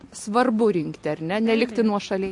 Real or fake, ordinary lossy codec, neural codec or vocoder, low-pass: real; MP3, 48 kbps; none; 10.8 kHz